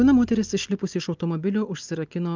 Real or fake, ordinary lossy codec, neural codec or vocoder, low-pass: real; Opus, 24 kbps; none; 7.2 kHz